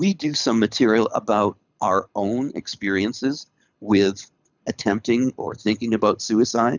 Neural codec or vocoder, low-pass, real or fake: codec, 24 kHz, 6 kbps, HILCodec; 7.2 kHz; fake